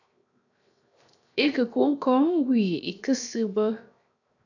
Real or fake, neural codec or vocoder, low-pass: fake; codec, 16 kHz, 0.7 kbps, FocalCodec; 7.2 kHz